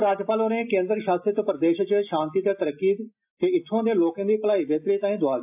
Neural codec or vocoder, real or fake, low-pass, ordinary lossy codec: none; real; 3.6 kHz; AAC, 32 kbps